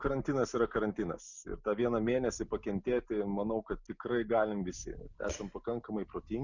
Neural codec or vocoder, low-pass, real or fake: none; 7.2 kHz; real